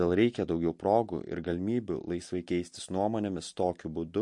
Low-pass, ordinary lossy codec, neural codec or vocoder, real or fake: 10.8 kHz; MP3, 48 kbps; none; real